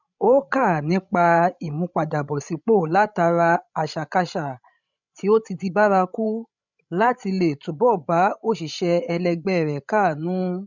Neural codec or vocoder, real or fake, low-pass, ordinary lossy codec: codec, 16 kHz, 16 kbps, FreqCodec, larger model; fake; 7.2 kHz; none